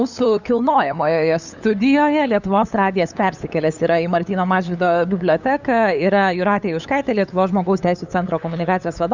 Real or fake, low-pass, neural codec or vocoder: fake; 7.2 kHz; codec, 16 kHz, 8 kbps, FunCodec, trained on LibriTTS, 25 frames a second